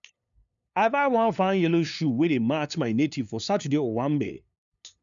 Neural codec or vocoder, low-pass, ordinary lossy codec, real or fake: codec, 16 kHz, 2 kbps, FunCodec, trained on LibriTTS, 25 frames a second; 7.2 kHz; none; fake